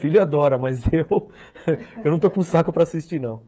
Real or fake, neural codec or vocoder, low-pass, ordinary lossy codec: fake; codec, 16 kHz, 8 kbps, FreqCodec, smaller model; none; none